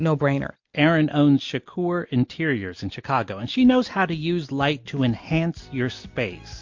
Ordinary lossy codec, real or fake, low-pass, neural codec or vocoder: MP3, 48 kbps; real; 7.2 kHz; none